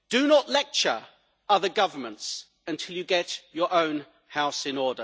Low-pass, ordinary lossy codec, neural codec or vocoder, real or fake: none; none; none; real